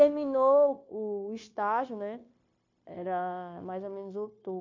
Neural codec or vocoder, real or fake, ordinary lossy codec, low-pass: codec, 16 kHz, 0.9 kbps, LongCat-Audio-Codec; fake; MP3, 48 kbps; 7.2 kHz